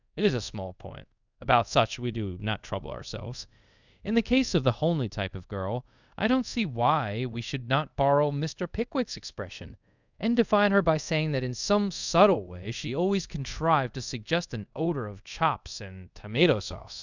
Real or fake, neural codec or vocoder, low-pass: fake; codec, 24 kHz, 0.5 kbps, DualCodec; 7.2 kHz